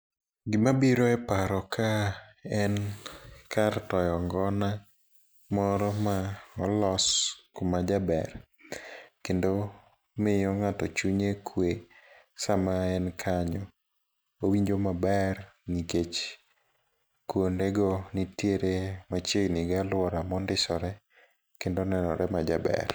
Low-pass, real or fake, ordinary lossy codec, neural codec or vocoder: none; real; none; none